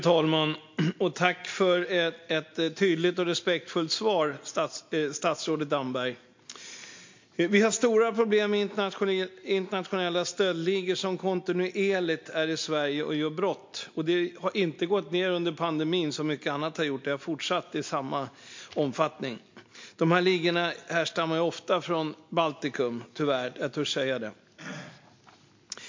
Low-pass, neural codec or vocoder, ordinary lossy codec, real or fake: 7.2 kHz; none; MP3, 48 kbps; real